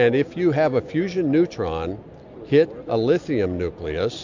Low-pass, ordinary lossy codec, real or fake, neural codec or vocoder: 7.2 kHz; MP3, 64 kbps; real; none